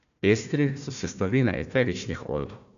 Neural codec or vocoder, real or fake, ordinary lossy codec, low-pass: codec, 16 kHz, 1 kbps, FunCodec, trained on Chinese and English, 50 frames a second; fake; MP3, 96 kbps; 7.2 kHz